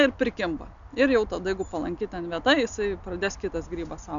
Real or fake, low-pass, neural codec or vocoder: real; 7.2 kHz; none